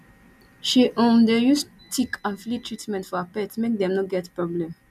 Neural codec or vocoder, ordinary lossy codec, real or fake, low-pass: none; none; real; 14.4 kHz